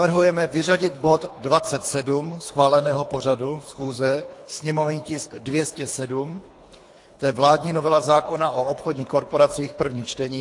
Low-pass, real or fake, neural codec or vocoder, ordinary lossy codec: 10.8 kHz; fake; codec, 24 kHz, 3 kbps, HILCodec; AAC, 48 kbps